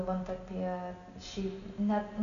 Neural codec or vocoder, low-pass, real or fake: none; 7.2 kHz; real